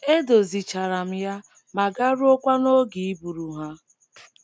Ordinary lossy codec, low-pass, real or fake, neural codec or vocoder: none; none; real; none